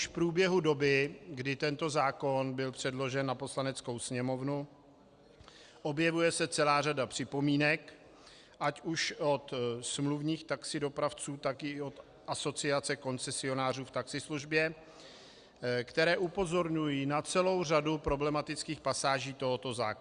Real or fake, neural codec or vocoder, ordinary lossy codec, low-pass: real; none; Opus, 64 kbps; 9.9 kHz